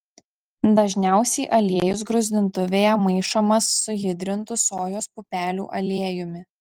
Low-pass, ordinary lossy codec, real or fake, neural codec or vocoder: 19.8 kHz; Opus, 24 kbps; fake; vocoder, 44.1 kHz, 128 mel bands every 256 samples, BigVGAN v2